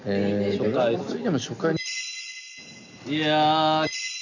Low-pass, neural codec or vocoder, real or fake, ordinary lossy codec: 7.2 kHz; none; real; none